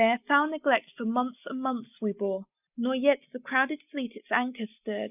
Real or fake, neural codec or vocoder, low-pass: real; none; 3.6 kHz